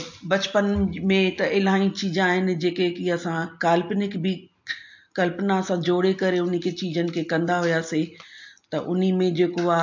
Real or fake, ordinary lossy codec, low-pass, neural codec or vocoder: real; MP3, 48 kbps; 7.2 kHz; none